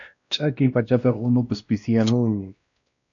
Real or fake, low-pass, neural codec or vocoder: fake; 7.2 kHz; codec, 16 kHz, 1 kbps, X-Codec, WavLM features, trained on Multilingual LibriSpeech